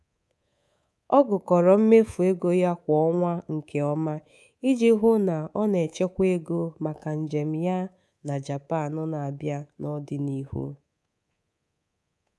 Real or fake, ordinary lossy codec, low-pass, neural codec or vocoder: fake; none; none; codec, 24 kHz, 3.1 kbps, DualCodec